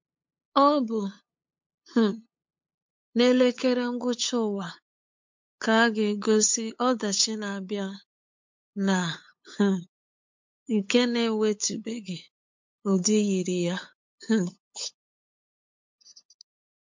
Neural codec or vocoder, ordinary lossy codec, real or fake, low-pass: codec, 16 kHz, 8 kbps, FunCodec, trained on LibriTTS, 25 frames a second; MP3, 48 kbps; fake; 7.2 kHz